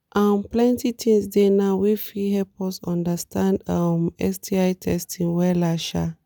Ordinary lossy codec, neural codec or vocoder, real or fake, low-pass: none; none; real; none